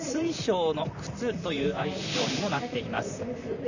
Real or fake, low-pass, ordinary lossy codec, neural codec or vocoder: fake; 7.2 kHz; none; vocoder, 44.1 kHz, 128 mel bands, Pupu-Vocoder